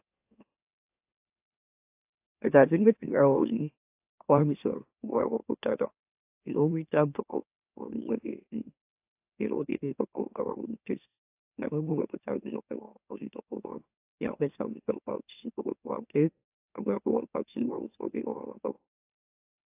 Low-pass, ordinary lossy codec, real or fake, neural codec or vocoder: 3.6 kHz; AAC, 32 kbps; fake; autoencoder, 44.1 kHz, a latent of 192 numbers a frame, MeloTTS